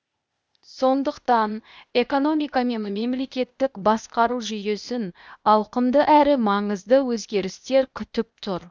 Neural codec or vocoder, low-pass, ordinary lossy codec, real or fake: codec, 16 kHz, 0.8 kbps, ZipCodec; none; none; fake